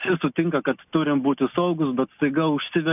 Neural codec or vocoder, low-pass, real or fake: none; 3.6 kHz; real